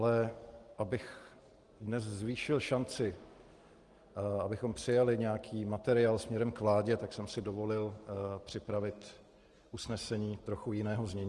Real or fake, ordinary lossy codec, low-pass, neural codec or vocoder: real; Opus, 24 kbps; 10.8 kHz; none